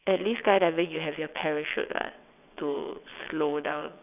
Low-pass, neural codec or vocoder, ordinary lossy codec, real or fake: 3.6 kHz; vocoder, 22.05 kHz, 80 mel bands, WaveNeXt; none; fake